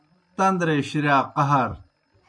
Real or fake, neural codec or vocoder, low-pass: real; none; 9.9 kHz